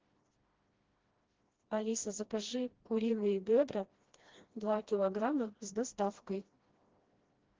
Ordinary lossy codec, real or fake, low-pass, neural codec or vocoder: Opus, 16 kbps; fake; 7.2 kHz; codec, 16 kHz, 1 kbps, FreqCodec, smaller model